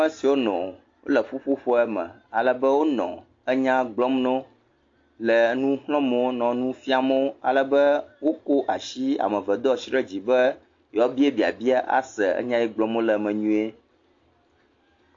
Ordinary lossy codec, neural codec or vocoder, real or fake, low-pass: AAC, 48 kbps; none; real; 7.2 kHz